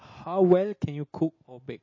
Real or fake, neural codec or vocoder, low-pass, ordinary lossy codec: real; none; 7.2 kHz; MP3, 32 kbps